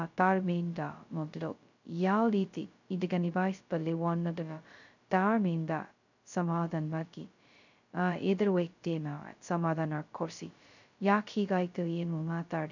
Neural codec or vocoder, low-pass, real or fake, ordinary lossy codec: codec, 16 kHz, 0.2 kbps, FocalCodec; 7.2 kHz; fake; none